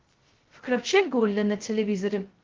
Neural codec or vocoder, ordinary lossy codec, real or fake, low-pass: codec, 16 kHz, 0.2 kbps, FocalCodec; Opus, 16 kbps; fake; 7.2 kHz